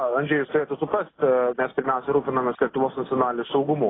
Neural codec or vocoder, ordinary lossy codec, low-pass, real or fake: none; AAC, 16 kbps; 7.2 kHz; real